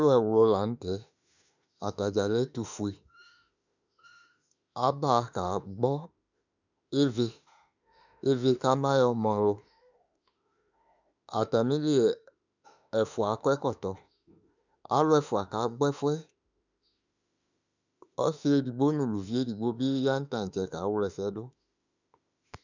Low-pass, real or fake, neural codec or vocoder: 7.2 kHz; fake; autoencoder, 48 kHz, 32 numbers a frame, DAC-VAE, trained on Japanese speech